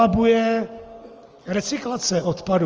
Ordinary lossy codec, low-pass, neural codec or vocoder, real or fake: Opus, 24 kbps; 7.2 kHz; none; real